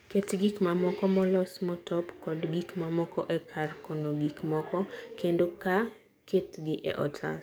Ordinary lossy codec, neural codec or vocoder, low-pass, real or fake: none; codec, 44.1 kHz, 7.8 kbps, DAC; none; fake